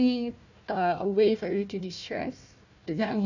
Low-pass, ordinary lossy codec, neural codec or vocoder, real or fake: 7.2 kHz; none; codec, 16 kHz, 1 kbps, FunCodec, trained on Chinese and English, 50 frames a second; fake